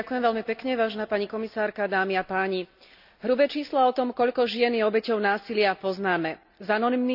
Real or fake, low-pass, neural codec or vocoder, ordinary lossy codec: real; 5.4 kHz; none; none